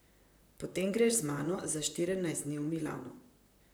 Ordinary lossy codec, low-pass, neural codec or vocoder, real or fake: none; none; vocoder, 44.1 kHz, 128 mel bands, Pupu-Vocoder; fake